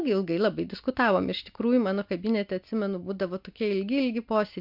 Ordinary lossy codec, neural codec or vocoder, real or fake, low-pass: MP3, 48 kbps; none; real; 5.4 kHz